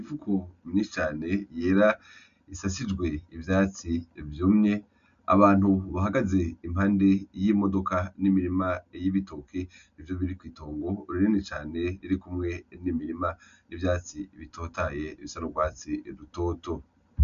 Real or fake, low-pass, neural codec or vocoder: real; 7.2 kHz; none